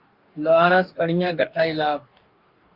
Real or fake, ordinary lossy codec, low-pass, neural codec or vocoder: fake; Opus, 24 kbps; 5.4 kHz; codec, 44.1 kHz, 2.6 kbps, DAC